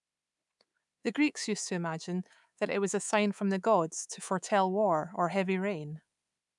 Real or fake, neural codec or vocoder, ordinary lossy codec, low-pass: fake; codec, 24 kHz, 3.1 kbps, DualCodec; none; 10.8 kHz